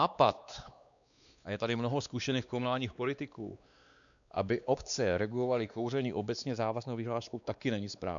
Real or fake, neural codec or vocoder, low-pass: fake; codec, 16 kHz, 2 kbps, X-Codec, WavLM features, trained on Multilingual LibriSpeech; 7.2 kHz